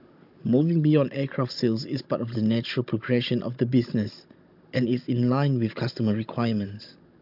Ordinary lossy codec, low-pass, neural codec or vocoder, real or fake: MP3, 48 kbps; 5.4 kHz; codec, 16 kHz, 16 kbps, FunCodec, trained on Chinese and English, 50 frames a second; fake